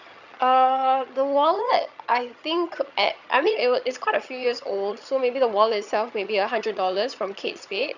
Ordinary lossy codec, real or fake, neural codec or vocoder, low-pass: none; fake; vocoder, 22.05 kHz, 80 mel bands, HiFi-GAN; 7.2 kHz